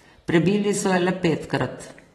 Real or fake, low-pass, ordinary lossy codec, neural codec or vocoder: fake; 19.8 kHz; AAC, 32 kbps; vocoder, 44.1 kHz, 128 mel bands every 512 samples, BigVGAN v2